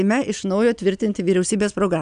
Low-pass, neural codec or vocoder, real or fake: 9.9 kHz; none; real